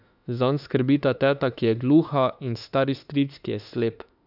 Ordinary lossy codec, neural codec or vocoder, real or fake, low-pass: none; autoencoder, 48 kHz, 32 numbers a frame, DAC-VAE, trained on Japanese speech; fake; 5.4 kHz